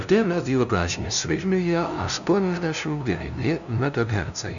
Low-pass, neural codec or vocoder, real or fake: 7.2 kHz; codec, 16 kHz, 0.5 kbps, FunCodec, trained on LibriTTS, 25 frames a second; fake